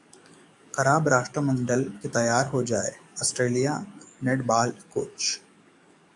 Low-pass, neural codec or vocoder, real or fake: 10.8 kHz; codec, 44.1 kHz, 7.8 kbps, DAC; fake